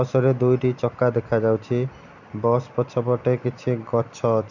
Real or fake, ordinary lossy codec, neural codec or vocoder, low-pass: real; none; none; 7.2 kHz